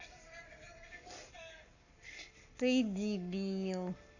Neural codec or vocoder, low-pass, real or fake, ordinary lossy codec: codec, 44.1 kHz, 7.8 kbps, Pupu-Codec; 7.2 kHz; fake; none